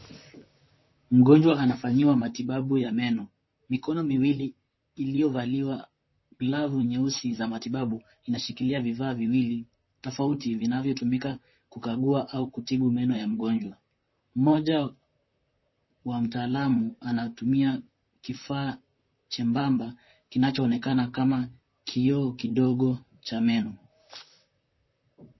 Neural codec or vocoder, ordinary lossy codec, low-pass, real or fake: vocoder, 44.1 kHz, 128 mel bands, Pupu-Vocoder; MP3, 24 kbps; 7.2 kHz; fake